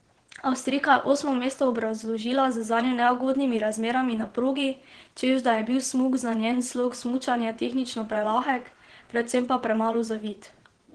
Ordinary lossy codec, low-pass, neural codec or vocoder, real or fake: Opus, 16 kbps; 9.9 kHz; vocoder, 22.05 kHz, 80 mel bands, WaveNeXt; fake